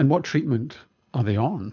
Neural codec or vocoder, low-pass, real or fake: codec, 24 kHz, 6 kbps, HILCodec; 7.2 kHz; fake